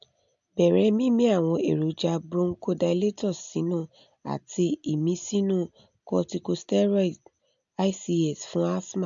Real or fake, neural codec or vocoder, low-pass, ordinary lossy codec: real; none; 7.2 kHz; MP3, 64 kbps